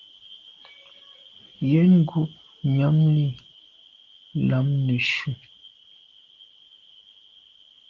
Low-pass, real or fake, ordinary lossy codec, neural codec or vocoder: 7.2 kHz; real; Opus, 16 kbps; none